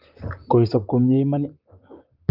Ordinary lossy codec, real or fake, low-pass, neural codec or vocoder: Opus, 24 kbps; fake; 5.4 kHz; vocoder, 44.1 kHz, 128 mel bands, Pupu-Vocoder